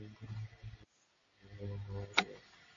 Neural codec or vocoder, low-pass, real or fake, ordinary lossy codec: none; 7.2 kHz; real; MP3, 32 kbps